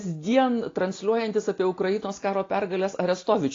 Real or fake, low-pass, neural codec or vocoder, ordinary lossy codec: real; 7.2 kHz; none; AAC, 32 kbps